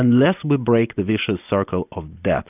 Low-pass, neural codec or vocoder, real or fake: 3.6 kHz; none; real